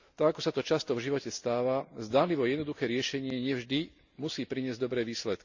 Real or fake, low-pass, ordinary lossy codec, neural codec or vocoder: real; 7.2 kHz; none; none